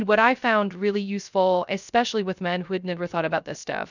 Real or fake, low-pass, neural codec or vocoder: fake; 7.2 kHz; codec, 16 kHz, 0.3 kbps, FocalCodec